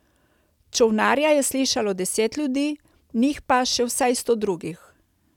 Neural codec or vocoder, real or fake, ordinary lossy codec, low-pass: none; real; none; 19.8 kHz